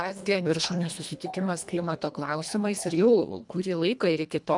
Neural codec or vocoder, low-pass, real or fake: codec, 24 kHz, 1.5 kbps, HILCodec; 10.8 kHz; fake